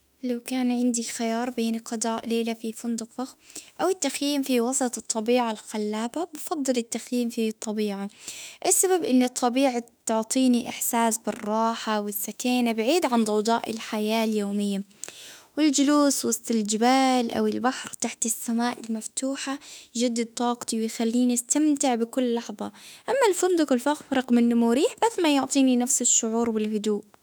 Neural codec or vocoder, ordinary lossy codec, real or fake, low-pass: autoencoder, 48 kHz, 32 numbers a frame, DAC-VAE, trained on Japanese speech; none; fake; none